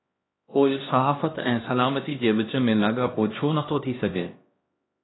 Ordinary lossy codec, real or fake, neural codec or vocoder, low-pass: AAC, 16 kbps; fake; codec, 16 kHz, 1 kbps, X-Codec, HuBERT features, trained on LibriSpeech; 7.2 kHz